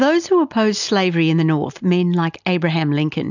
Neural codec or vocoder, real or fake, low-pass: none; real; 7.2 kHz